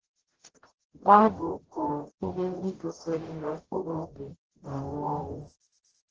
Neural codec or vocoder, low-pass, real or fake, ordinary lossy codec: codec, 44.1 kHz, 0.9 kbps, DAC; 7.2 kHz; fake; Opus, 16 kbps